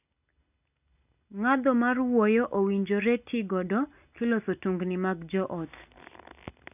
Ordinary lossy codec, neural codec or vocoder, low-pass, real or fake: none; none; 3.6 kHz; real